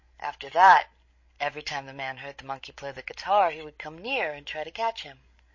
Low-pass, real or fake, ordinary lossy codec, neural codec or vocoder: 7.2 kHz; fake; MP3, 32 kbps; codec, 16 kHz, 16 kbps, FreqCodec, larger model